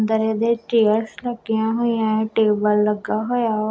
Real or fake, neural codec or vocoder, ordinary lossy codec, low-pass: real; none; none; none